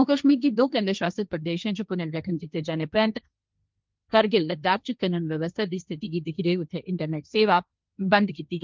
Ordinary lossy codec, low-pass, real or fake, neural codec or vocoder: Opus, 24 kbps; 7.2 kHz; fake; codec, 16 kHz, 1.1 kbps, Voila-Tokenizer